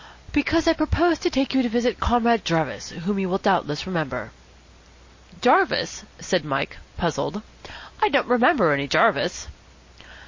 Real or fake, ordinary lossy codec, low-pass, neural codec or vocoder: real; MP3, 32 kbps; 7.2 kHz; none